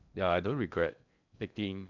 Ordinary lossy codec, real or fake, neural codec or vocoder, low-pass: none; fake; codec, 16 kHz in and 24 kHz out, 0.8 kbps, FocalCodec, streaming, 65536 codes; 7.2 kHz